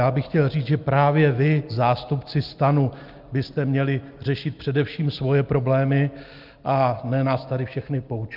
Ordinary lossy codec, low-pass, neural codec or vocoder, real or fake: Opus, 24 kbps; 5.4 kHz; none; real